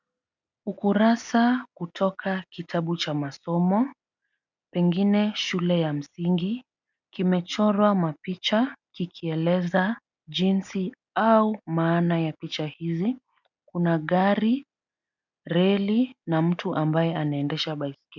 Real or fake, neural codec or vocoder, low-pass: real; none; 7.2 kHz